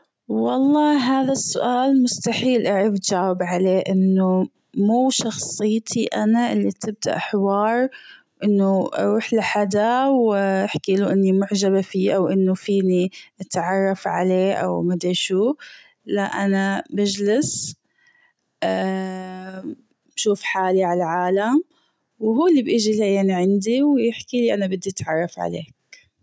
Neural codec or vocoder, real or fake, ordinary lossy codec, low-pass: none; real; none; none